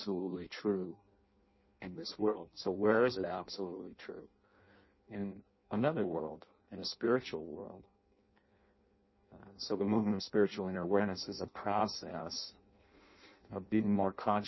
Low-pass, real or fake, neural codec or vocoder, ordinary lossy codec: 7.2 kHz; fake; codec, 16 kHz in and 24 kHz out, 0.6 kbps, FireRedTTS-2 codec; MP3, 24 kbps